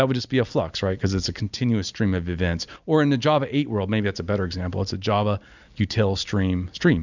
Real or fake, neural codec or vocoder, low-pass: real; none; 7.2 kHz